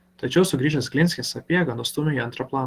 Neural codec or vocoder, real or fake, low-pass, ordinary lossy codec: none; real; 14.4 kHz; Opus, 16 kbps